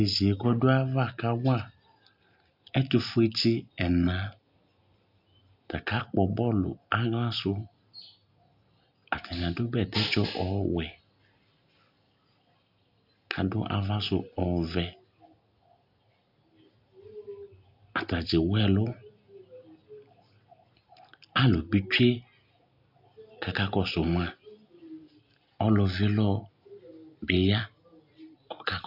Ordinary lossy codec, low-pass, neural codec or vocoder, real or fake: AAC, 48 kbps; 5.4 kHz; none; real